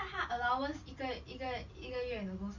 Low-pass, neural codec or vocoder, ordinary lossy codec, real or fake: 7.2 kHz; none; none; real